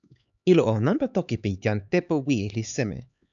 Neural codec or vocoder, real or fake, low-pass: codec, 16 kHz, 4 kbps, X-Codec, HuBERT features, trained on LibriSpeech; fake; 7.2 kHz